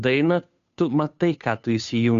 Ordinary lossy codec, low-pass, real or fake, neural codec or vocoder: MP3, 64 kbps; 7.2 kHz; fake; codec, 16 kHz, 2 kbps, FunCodec, trained on Chinese and English, 25 frames a second